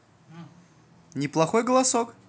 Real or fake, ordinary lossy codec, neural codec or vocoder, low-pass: real; none; none; none